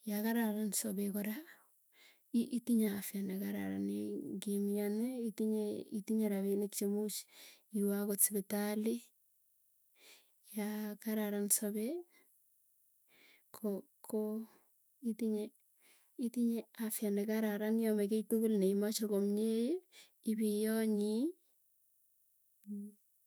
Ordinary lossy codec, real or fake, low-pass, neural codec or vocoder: none; fake; none; autoencoder, 48 kHz, 128 numbers a frame, DAC-VAE, trained on Japanese speech